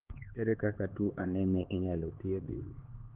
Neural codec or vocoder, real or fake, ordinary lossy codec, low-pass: codec, 16 kHz, 4 kbps, X-Codec, HuBERT features, trained on LibriSpeech; fake; Opus, 16 kbps; 3.6 kHz